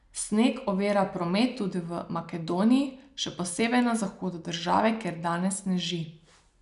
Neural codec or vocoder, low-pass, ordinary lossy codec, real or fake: none; 10.8 kHz; none; real